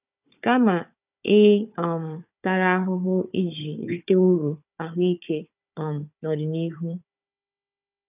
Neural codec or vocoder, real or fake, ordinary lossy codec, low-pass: codec, 16 kHz, 4 kbps, FunCodec, trained on Chinese and English, 50 frames a second; fake; none; 3.6 kHz